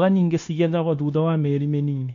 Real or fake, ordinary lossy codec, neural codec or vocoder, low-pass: fake; none; codec, 16 kHz, 0.9 kbps, LongCat-Audio-Codec; 7.2 kHz